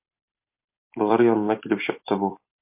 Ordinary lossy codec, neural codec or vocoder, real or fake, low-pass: MP3, 32 kbps; vocoder, 44.1 kHz, 128 mel bands every 256 samples, BigVGAN v2; fake; 3.6 kHz